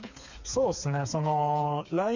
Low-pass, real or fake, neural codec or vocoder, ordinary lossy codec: 7.2 kHz; fake; codec, 16 kHz, 4 kbps, FreqCodec, smaller model; none